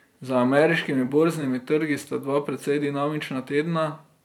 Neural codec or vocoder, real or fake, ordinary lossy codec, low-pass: vocoder, 44.1 kHz, 128 mel bands every 512 samples, BigVGAN v2; fake; none; 19.8 kHz